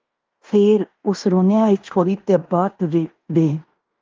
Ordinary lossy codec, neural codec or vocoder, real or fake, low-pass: Opus, 32 kbps; codec, 16 kHz in and 24 kHz out, 0.9 kbps, LongCat-Audio-Codec, fine tuned four codebook decoder; fake; 7.2 kHz